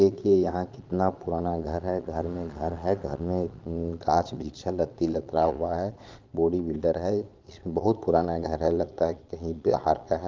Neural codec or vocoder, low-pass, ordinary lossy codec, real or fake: vocoder, 22.05 kHz, 80 mel bands, Vocos; 7.2 kHz; Opus, 24 kbps; fake